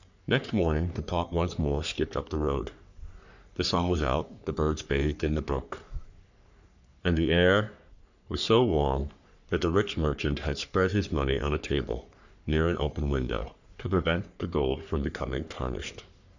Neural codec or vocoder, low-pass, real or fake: codec, 44.1 kHz, 3.4 kbps, Pupu-Codec; 7.2 kHz; fake